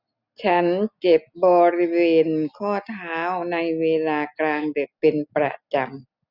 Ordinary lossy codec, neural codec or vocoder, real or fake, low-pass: none; none; real; 5.4 kHz